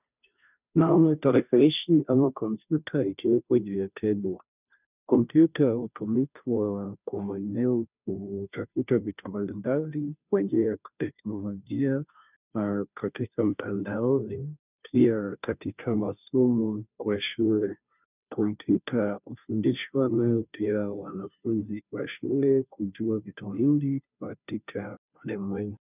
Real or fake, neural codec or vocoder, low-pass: fake; codec, 16 kHz, 0.5 kbps, FunCodec, trained on Chinese and English, 25 frames a second; 3.6 kHz